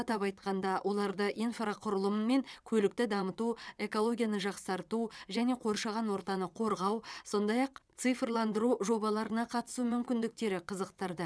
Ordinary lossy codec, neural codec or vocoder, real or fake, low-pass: none; vocoder, 22.05 kHz, 80 mel bands, WaveNeXt; fake; none